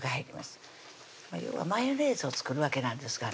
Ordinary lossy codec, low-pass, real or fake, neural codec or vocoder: none; none; real; none